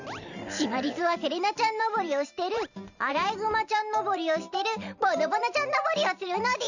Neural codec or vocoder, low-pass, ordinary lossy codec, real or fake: vocoder, 44.1 kHz, 128 mel bands every 512 samples, BigVGAN v2; 7.2 kHz; none; fake